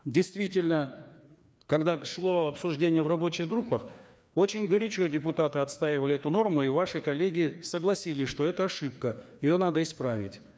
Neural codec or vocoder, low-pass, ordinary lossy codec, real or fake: codec, 16 kHz, 2 kbps, FreqCodec, larger model; none; none; fake